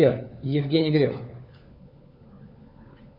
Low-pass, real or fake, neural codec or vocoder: 5.4 kHz; fake; codec, 16 kHz, 4 kbps, FunCodec, trained on LibriTTS, 50 frames a second